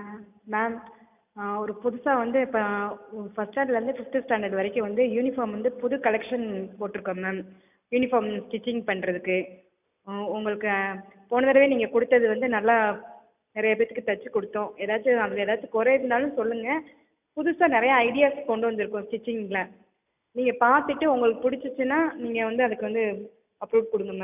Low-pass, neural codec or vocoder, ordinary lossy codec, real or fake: 3.6 kHz; none; none; real